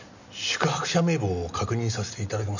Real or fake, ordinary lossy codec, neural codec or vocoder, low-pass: real; none; none; 7.2 kHz